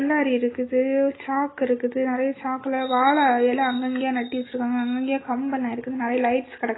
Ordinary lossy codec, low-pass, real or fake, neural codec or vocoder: AAC, 16 kbps; 7.2 kHz; real; none